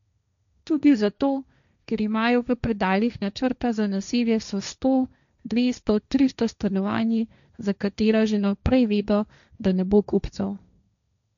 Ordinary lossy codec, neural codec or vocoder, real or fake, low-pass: none; codec, 16 kHz, 1.1 kbps, Voila-Tokenizer; fake; 7.2 kHz